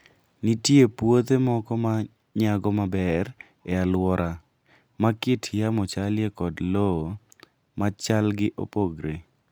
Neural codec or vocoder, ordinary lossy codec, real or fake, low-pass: none; none; real; none